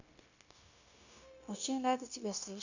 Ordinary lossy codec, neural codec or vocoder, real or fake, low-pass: none; codec, 16 kHz, 0.9 kbps, LongCat-Audio-Codec; fake; 7.2 kHz